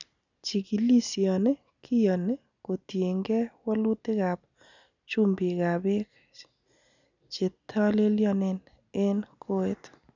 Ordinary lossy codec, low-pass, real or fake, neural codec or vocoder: none; 7.2 kHz; real; none